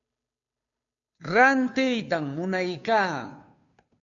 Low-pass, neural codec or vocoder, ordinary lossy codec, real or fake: 7.2 kHz; codec, 16 kHz, 2 kbps, FunCodec, trained on Chinese and English, 25 frames a second; AAC, 64 kbps; fake